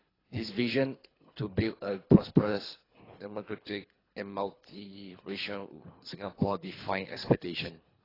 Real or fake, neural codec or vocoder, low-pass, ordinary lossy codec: fake; codec, 24 kHz, 3 kbps, HILCodec; 5.4 kHz; AAC, 24 kbps